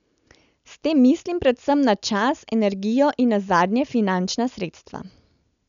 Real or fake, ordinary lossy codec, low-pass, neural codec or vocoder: real; none; 7.2 kHz; none